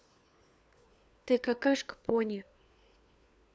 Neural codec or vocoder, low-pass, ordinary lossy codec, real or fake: codec, 16 kHz, 4 kbps, FunCodec, trained on LibriTTS, 50 frames a second; none; none; fake